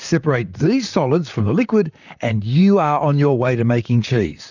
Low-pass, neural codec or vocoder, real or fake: 7.2 kHz; vocoder, 44.1 kHz, 128 mel bands, Pupu-Vocoder; fake